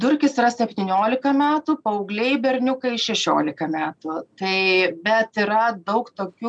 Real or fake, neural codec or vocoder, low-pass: real; none; 9.9 kHz